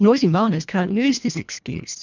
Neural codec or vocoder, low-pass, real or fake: codec, 24 kHz, 1.5 kbps, HILCodec; 7.2 kHz; fake